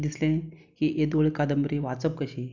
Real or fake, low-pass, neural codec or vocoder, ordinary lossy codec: real; 7.2 kHz; none; none